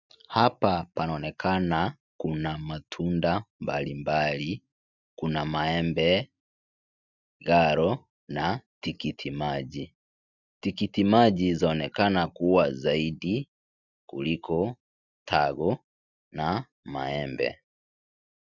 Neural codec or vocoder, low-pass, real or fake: none; 7.2 kHz; real